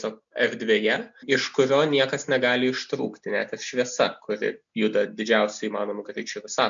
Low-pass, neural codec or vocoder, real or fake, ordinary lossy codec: 7.2 kHz; none; real; MP3, 64 kbps